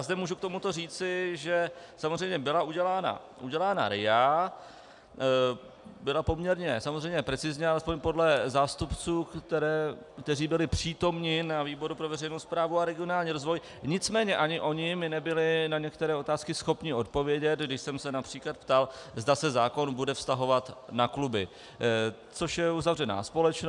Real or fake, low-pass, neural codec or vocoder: real; 10.8 kHz; none